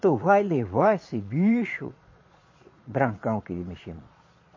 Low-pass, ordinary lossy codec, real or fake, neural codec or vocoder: 7.2 kHz; MP3, 32 kbps; fake; vocoder, 22.05 kHz, 80 mel bands, Vocos